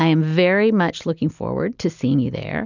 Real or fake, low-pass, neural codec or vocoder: real; 7.2 kHz; none